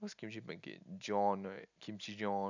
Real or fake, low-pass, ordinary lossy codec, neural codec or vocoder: real; 7.2 kHz; none; none